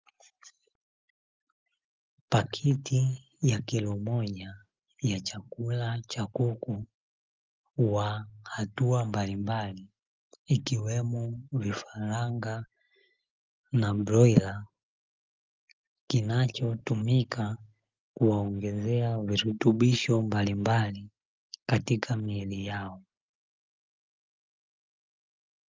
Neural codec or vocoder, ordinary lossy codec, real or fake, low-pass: none; Opus, 24 kbps; real; 7.2 kHz